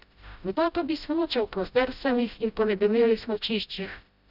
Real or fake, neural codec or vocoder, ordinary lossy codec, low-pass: fake; codec, 16 kHz, 0.5 kbps, FreqCodec, smaller model; none; 5.4 kHz